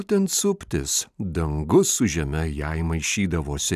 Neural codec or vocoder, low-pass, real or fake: none; 14.4 kHz; real